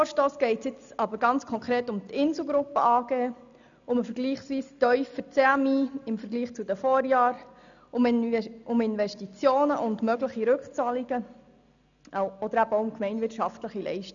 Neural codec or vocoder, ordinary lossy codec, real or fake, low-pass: none; none; real; 7.2 kHz